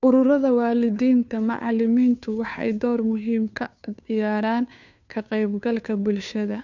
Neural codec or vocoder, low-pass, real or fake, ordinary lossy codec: codec, 16 kHz, 2 kbps, FunCodec, trained on Chinese and English, 25 frames a second; 7.2 kHz; fake; none